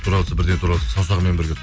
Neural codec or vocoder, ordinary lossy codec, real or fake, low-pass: none; none; real; none